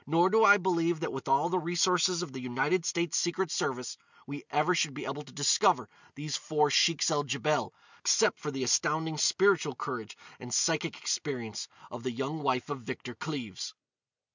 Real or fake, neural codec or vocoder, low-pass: real; none; 7.2 kHz